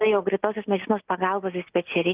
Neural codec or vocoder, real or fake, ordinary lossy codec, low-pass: none; real; Opus, 32 kbps; 3.6 kHz